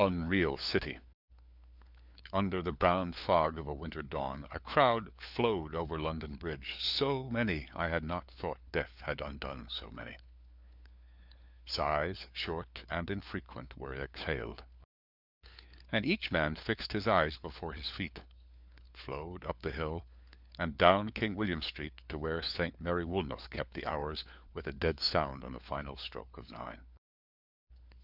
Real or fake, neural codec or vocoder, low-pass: fake; codec, 16 kHz, 4 kbps, FunCodec, trained on LibriTTS, 50 frames a second; 5.4 kHz